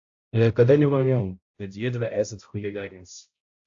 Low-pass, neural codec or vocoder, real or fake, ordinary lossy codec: 7.2 kHz; codec, 16 kHz, 0.5 kbps, X-Codec, HuBERT features, trained on balanced general audio; fake; AAC, 48 kbps